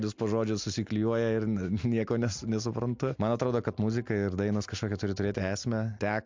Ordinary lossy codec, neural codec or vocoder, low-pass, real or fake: MP3, 64 kbps; none; 7.2 kHz; real